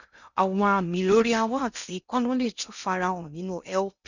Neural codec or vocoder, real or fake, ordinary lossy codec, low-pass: codec, 16 kHz in and 24 kHz out, 0.6 kbps, FocalCodec, streaming, 2048 codes; fake; none; 7.2 kHz